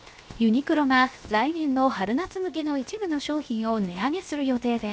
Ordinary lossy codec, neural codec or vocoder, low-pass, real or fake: none; codec, 16 kHz, 0.7 kbps, FocalCodec; none; fake